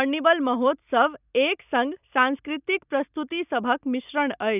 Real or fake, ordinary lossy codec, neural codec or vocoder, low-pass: real; none; none; 3.6 kHz